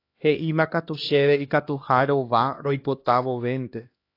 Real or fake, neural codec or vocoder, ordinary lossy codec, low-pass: fake; codec, 16 kHz, 1 kbps, X-Codec, HuBERT features, trained on LibriSpeech; AAC, 32 kbps; 5.4 kHz